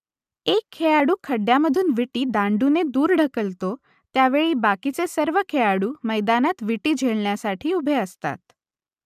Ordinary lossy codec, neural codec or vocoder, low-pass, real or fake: none; none; 14.4 kHz; real